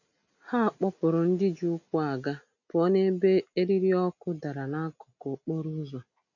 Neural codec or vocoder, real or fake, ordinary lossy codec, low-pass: none; real; none; 7.2 kHz